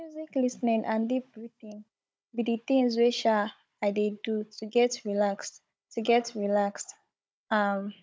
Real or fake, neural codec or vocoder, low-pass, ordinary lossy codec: fake; codec, 16 kHz, 16 kbps, FunCodec, trained on Chinese and English, 50 frames a second; none; none